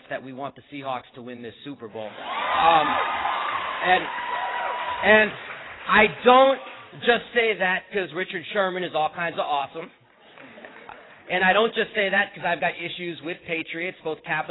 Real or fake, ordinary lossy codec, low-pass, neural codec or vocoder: fake; AAC, 16 kbps; 7.2 kHz; vocoder, 22.05 kHz, 80 mel bands, WaveNeXt